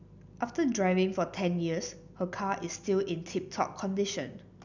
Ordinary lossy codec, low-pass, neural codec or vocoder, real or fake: none; 7.2 kHz; none; real